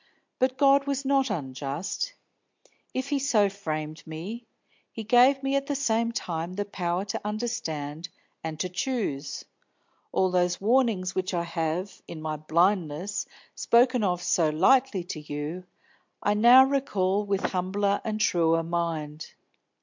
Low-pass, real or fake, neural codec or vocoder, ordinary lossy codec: 7.2 kHz; real; none; MP3, 64 kbps